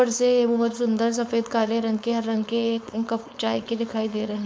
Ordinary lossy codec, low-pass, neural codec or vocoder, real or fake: none; none; codec, 16 kHz, 4.8 kbps, FACodec; fake